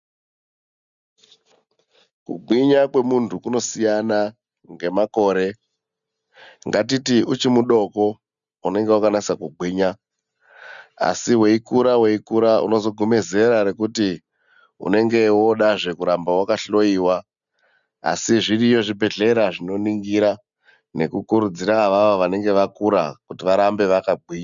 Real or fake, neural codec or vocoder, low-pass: real; none; 7.2 kHz